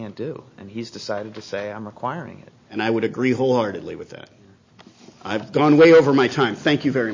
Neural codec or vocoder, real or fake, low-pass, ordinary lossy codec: none; real; 7.2 kHz; MP3, 32 kbps